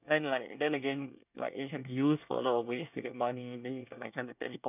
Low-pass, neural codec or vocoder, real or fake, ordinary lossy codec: 3.6 kHz; codec, 24 kHz, 1 kbps, SNAC; fake; none